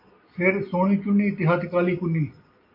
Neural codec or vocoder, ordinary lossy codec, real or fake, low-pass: none; MP3, 32 kbps; real; 5.4 kHz